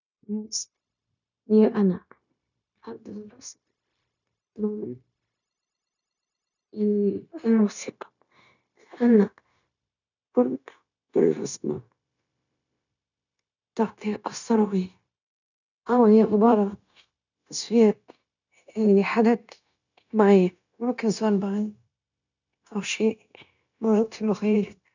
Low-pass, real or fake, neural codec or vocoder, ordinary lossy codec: 7.2 kHz; fake; codec, 16 kHz, 0.9 kbps, LongCat-Audio-Codec; none